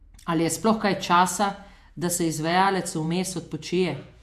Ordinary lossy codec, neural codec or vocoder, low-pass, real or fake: AAC, 96 kbps; vocoder, 48 kHz, 128 mel bands, Vocos; 14.4 kHz; fake